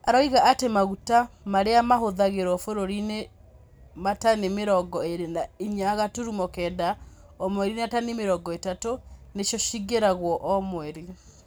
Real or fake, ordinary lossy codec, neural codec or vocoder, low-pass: real; none; none; none